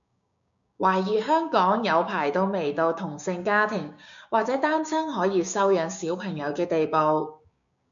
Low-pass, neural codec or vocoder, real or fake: 7.2 kHz; codec, 16 kHz, 6 kbps, DAC; fake